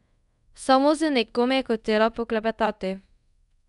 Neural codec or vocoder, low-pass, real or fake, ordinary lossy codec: codec, 24 kHz, 0.5 kbps, DualCodec; 10.8 kHz; fake; none